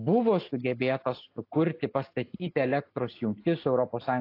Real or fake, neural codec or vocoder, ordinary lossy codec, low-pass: real; none; AAC, 32 kbps; 5.4 kHz